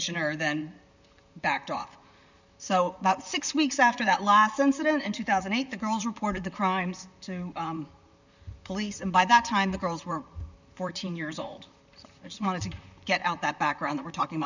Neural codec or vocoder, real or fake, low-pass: vocoder, 44.1 kHz, 128 mel bands, Pupu-Vocoder; fake; 7.2 kHz